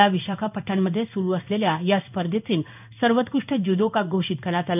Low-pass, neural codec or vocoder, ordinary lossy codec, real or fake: 3.6 kHz; codec, 16 kHz in and 24 kHz out, 1 kbps, XY-Tokenizer; none; fake